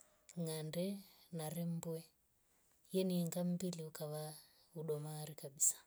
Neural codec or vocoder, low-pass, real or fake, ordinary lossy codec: none; none; real; none